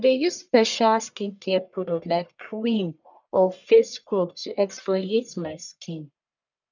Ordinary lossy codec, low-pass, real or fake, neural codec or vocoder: none; 7.2 kHz; fake; codec, 44.1 kHz, 1.7 kbps, Pupu-Codec